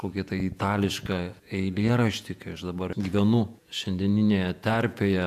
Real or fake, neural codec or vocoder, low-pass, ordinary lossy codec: fake; vocoder, 48 kHz, 128 mel bands, Vocos; 14.4 kHz; AAC, 96 kbps